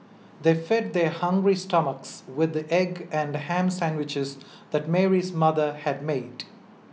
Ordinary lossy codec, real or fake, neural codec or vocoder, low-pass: none; real; none; none